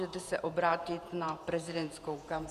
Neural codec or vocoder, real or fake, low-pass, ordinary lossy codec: vocoder, 48 kHz, 128 mel bands, Vocos; fake; 14.4 kHz; Opus, 64 kbps